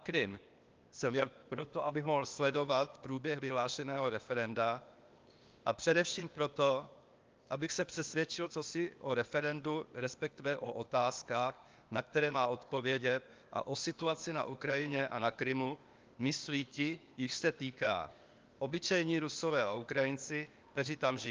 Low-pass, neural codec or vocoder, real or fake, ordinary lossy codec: 7.2 kHz; codec, 16 kHz, 0.8 kbps, ZipCodec; fake; Opus, 24 kbps